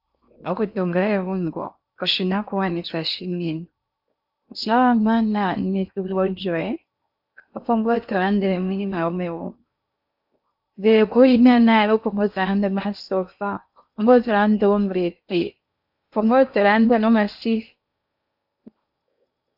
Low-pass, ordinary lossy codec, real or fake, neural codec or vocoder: 5.4 kHz; AAC, 48 kbps; fake; codec, 16 kHz in and 24 kHz out, 0.8 kbps, FocalCodec, streaming, 65536 codes